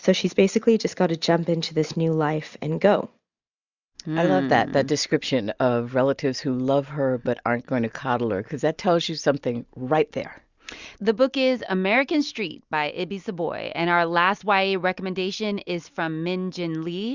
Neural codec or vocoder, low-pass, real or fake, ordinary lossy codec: none; 7.2 kHz; real; Opus, 64 kbps